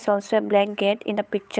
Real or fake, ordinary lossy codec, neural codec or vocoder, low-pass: fake; none; codec, 16 kHz, 8 kbps, FunCodec, trained on Chinese and English, 25 frames a second; none